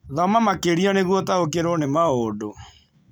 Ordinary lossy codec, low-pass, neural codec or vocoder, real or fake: none; none; none; real